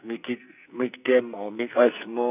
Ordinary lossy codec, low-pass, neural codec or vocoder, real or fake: none; 3.6 kHz; codec, 44.1 kHz, 2.6 kbps, SNAC; fake